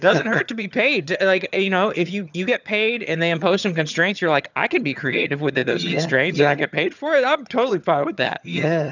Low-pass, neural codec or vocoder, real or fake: 7.2 kHz; vocoder, 22.05 kHz, 80 mel bands, HiFi-GAN; fake